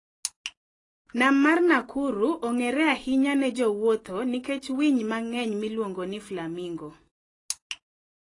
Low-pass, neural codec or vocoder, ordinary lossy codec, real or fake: 10.8 kHz; none; AAC, 32 kbps; real